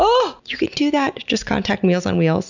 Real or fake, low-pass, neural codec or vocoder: real; 7.2 kHz; none